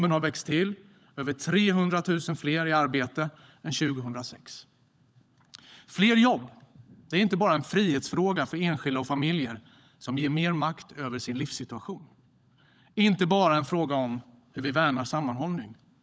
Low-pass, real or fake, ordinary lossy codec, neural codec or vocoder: none; fake; none; codec, 16 kHz, 16 kbps, FunCodec, trained on LibriTTS, 50 frames a second